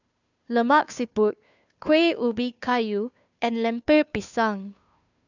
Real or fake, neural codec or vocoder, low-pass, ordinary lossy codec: fake; codec, 16 kHz, 2 kbps, FunCodec, trained on Chinese and English, 25 frames a second; 7.2 kHz; none